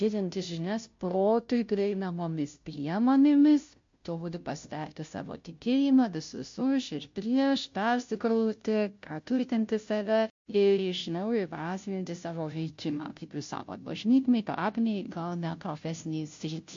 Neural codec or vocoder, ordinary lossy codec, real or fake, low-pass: codec, 16 kHz, 0.5 kbps, FunCodec, trained on Chinese and English, 25 frames a second; MP3, 48 kbps; fake; 7.2 kHz